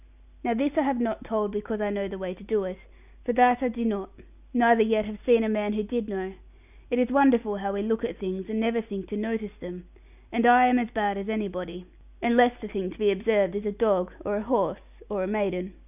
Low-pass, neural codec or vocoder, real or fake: 3.6 kHz; none; real